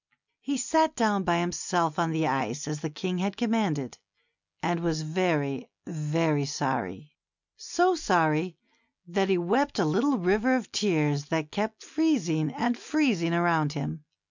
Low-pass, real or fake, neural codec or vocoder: 7.2 kHz; real; none